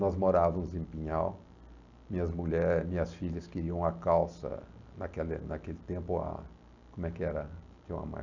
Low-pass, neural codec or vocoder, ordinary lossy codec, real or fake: 7.2 kHz; none; none; real